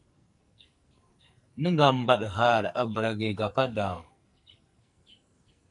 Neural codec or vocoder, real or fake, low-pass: codec, 44.1 kHz, 2.6 kbps, SNAC; fake; 10.8 kHz